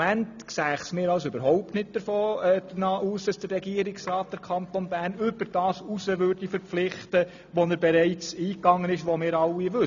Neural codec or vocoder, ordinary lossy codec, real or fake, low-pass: none; none; real; 7.2 kHz